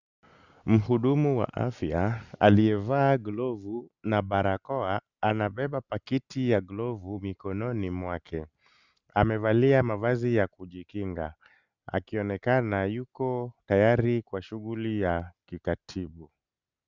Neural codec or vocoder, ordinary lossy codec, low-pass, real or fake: none; Opus, 64 kbps; 7.2 kHz; real